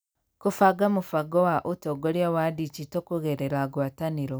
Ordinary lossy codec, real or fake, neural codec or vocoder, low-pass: none; real; none; none